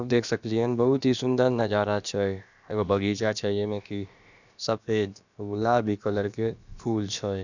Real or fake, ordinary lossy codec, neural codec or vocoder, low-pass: fake; none; codec, 16 kHz, about 1 kbps, DyCAST, with the encoder's durations; 7.2 kHz